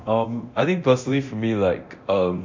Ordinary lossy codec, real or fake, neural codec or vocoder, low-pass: MP3, 64 kbps; fake; codec, 24 kHz, 0.9 kbps, DualCodec; 7.2 kHz